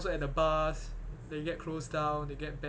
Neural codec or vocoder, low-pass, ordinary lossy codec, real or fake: none; none; none; real